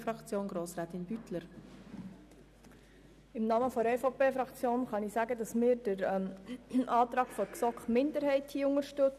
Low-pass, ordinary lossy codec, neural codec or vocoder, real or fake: 14.4 kHz; none; none; real